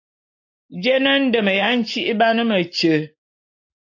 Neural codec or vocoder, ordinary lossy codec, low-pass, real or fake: none; AAC, 48 kbps; 7.2 kHz; real